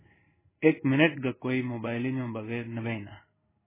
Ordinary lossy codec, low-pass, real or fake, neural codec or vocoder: MP3, 16 kbps; 3.6 kHz; fake; codec, 16 kHz in and 24 kHz out, 1 kbps, XY-Tokenizer